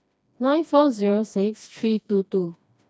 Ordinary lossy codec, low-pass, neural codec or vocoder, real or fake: none; none; codec, 16 kHz, 2 kbps, FreqCodec, smaller model; fake